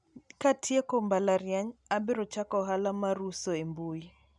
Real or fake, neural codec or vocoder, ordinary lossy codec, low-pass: real; none; none; 10.8 kHz